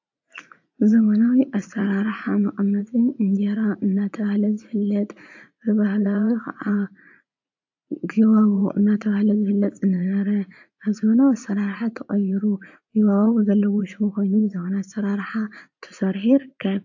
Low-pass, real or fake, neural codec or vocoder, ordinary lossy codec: 7.2 kHz; fake; vocoder, 44.1 kHz, 80 mel bands, Vocos; AAC, 48 kbps